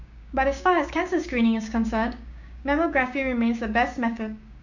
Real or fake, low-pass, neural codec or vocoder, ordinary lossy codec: fake; 7.2 kHz; codec, 16 kHz, 6 kbps, DAC; none